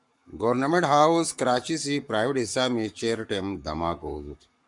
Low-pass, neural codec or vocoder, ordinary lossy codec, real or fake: 10.8 kHz; codec, 44.1 kHz, 7.8 kbps, Pupu-Codec; MP3, 96 kbps; fake